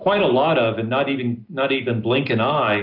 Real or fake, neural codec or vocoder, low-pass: real; none; 5.4 kHz